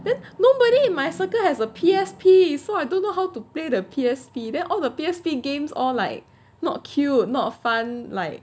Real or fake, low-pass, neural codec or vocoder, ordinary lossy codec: real; none; none; none